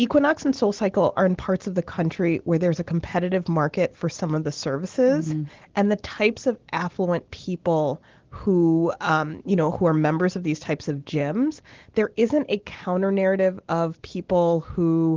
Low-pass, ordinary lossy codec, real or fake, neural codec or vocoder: 7.2 kHz; Opus, 16 kbps; real; none